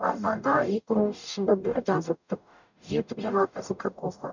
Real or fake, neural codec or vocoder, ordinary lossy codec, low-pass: fake; codec, 44.1 kHz, 0.9 kbps, DAC; none; 7.2 kHz